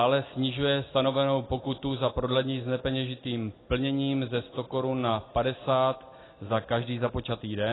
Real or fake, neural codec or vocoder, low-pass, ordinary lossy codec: real; none; 7.2 kHz; AAC, 16 kbps